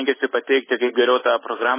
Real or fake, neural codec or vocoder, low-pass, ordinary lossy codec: real; none; 3.6 kHz; MP3, 16 kbps